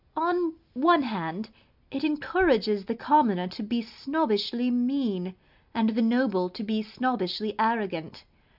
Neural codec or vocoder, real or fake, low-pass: none; real; 5.4 kHz